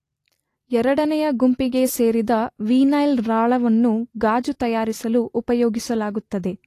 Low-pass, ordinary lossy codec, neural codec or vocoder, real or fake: 14.4 kHz; AAC, 48 kbps; none; real